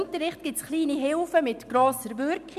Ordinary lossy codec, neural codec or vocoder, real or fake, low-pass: none; none; real; 14.4 kHz